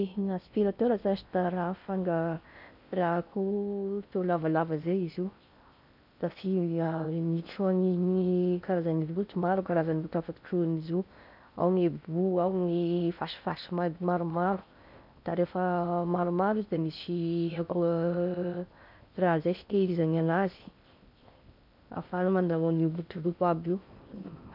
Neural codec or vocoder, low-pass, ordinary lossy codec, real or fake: codec, 16 kHz in and 24 kHz out, 0.6 kbps, FocalCodec, streaming, 4096 codes; 5.4 kHz; none; fake